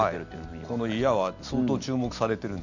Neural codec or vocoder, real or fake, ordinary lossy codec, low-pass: none; real; none; 7.2 kHz